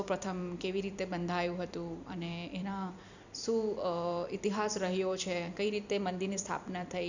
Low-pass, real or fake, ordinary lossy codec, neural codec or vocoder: 7.2 kHz; fake; none; vocoder, 44.1 kHz, 128 mel bands every 256 samples, BigVGAN v2